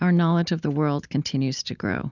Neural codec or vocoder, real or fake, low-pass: none; real; 7.2 kHz